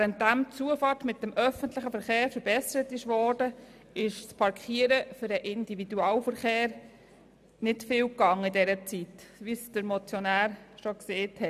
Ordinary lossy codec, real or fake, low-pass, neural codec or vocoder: none; real; 14.4 kHz; none